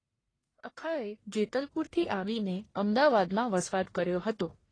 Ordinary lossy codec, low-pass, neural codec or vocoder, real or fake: AAC, 32 kbps; 9.9 kHz; codec, 44.1 kHz, 1.7 kbps, Pupu-Codec; fake